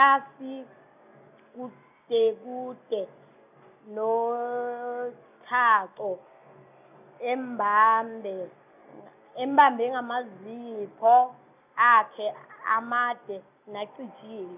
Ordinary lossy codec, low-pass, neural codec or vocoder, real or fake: none; 3.6 kHz; none; real